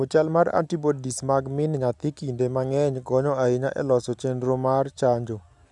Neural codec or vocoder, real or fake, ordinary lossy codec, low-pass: none; real; none; 10.8 kHz